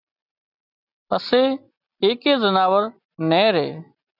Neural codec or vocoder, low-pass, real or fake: none; 5.4 kHz; real